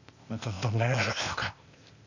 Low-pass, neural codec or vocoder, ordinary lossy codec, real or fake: 7.2 kHz; codec, 16 kHz, 0.8 kbps, ZipCodec; none; fake